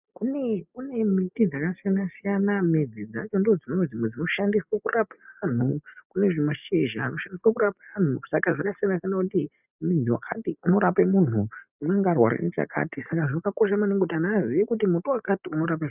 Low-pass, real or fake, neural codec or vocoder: 3.6 kHz; real; none